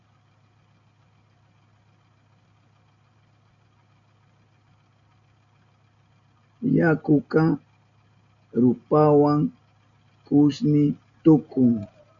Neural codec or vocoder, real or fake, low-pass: none; real; 7.2 kHz